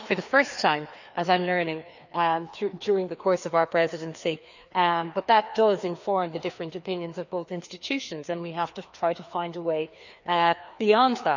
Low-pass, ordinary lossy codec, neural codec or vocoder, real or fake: 7.2 kHz; none; codec, 16 kHz, 2 kbps, FreqCodec, larger model; fake